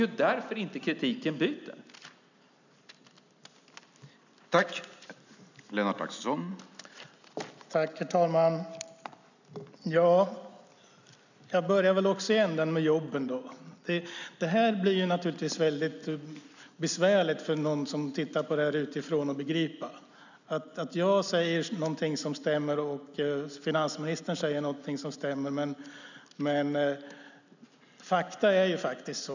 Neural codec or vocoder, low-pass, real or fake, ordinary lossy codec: none; 7.2 kHz; real; none